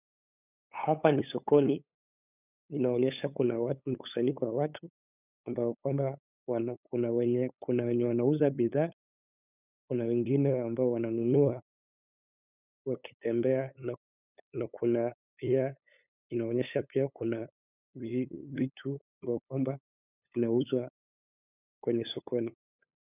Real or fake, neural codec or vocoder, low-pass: fake; codec, 16 kHz, 8 kbps, FunCodec, trained on LibriTTS, 25 frames a second; 3.6 kHz